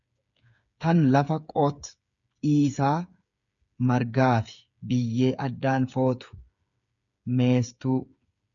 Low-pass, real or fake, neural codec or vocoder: 7.2 kHz; fake; codec, 16 kHz, 8 kbps, FreqCodec, smaller model